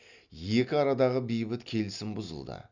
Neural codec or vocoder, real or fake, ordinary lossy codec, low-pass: none; real; none; 7.2 kHz